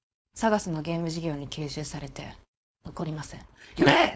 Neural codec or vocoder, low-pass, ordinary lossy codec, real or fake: codec, 16 kHz, 4.8 kbps, FACodec; none; none; fake